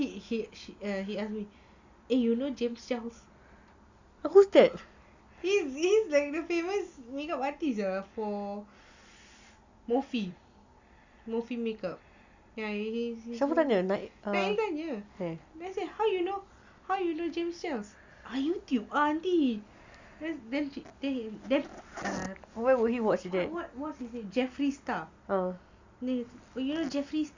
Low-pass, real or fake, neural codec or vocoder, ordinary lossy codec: 7.2 kHz; real; none; Opus, 64 kbps